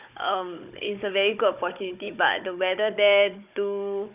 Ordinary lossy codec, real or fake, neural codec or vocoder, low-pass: none; fake; codec, 16 kHz, 16 kbps, FunCodec, trained on Chinese and English, 50 frames a second; 3.6 kHz